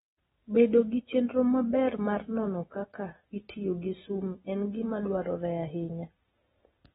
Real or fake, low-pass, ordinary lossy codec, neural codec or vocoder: real; 19.8 kHz; AAC, 16 kbps; none